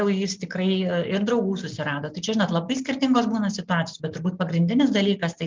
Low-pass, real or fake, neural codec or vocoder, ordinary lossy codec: 7.2 kHz; real; none; Opus, 24 kbps